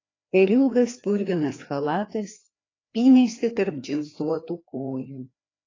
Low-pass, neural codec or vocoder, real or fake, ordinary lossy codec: 7.2 kHz; codec, 16 kHz, 2 kbps, FreqCodec, larger model; fake; AAC, 32 kbps